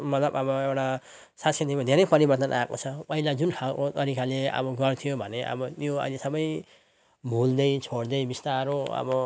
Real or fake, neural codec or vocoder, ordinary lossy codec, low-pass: real; none; none; none